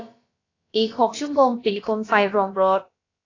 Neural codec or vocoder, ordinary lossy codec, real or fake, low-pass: codec, 16 kHz, about 1 kbps, DyCAST, with the encoder's durations; AAC, 32 kbps; fake; 7.2 kHz